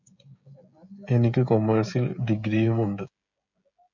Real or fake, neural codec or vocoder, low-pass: fake; codec, 16 kHz, 16 kbps, FreqCodec, smaller model; 7.2 kHz